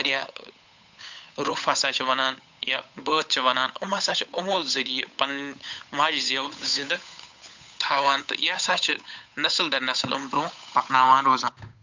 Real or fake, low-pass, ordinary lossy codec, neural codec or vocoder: fake; 7.2 kHz; MP3, 64 kbps; codec, 16 kHz, 8 kbps, FunCodec, trained on Chinese and English, 25 frames a second